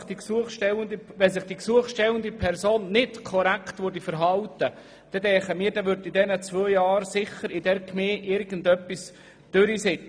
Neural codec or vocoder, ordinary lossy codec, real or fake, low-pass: none; none; real; none